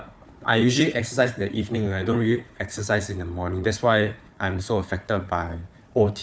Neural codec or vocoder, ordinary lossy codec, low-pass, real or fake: codec, 16 kHz, 4 kbps, FunCodec, trained on Chinese and English, 50 frames a second; none; none; fake